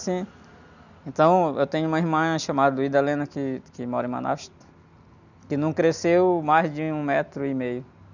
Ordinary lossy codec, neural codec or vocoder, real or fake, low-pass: none; none; real; 7.2 kHz